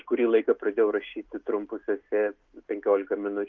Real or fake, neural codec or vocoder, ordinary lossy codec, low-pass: real; none; Opus, 32 kbps; 7.2 kHz